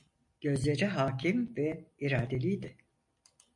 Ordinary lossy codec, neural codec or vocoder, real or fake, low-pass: MP3, 64 kbps; none; real; 10.8 kHz